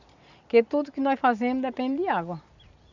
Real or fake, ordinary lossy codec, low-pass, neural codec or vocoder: real; none; 7.2 kHz; none